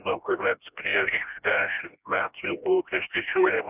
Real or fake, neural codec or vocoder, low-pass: fake; codec, 16 kHz, 1 kbps, FreqCodec, smaller model; 3.6 kHz